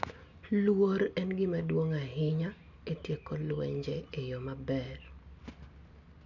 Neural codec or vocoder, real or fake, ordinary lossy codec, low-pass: none; real; none; 7.2 kHz